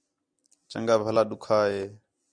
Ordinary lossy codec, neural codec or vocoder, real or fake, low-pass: Opus, 64 kbps; none; real; 9.9 kHz